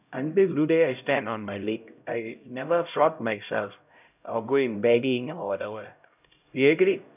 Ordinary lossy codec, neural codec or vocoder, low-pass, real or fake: none; codec, 16 kHz, 0.5 kbps, X-Codec, HuBERT features, trained on LibriSpeech; 3.6 kHz; fake